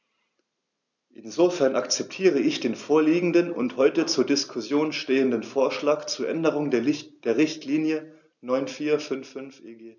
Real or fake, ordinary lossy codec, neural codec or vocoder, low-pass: real; none; none; 7.2 kHz